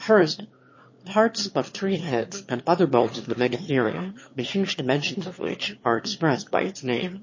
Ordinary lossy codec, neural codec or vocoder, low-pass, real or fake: MP3, 32 kbps; autoencoder, 22.05 kHz, a latent of 192 numbers a frame, VITS, trained on one speaker; 7.2 kHz; fake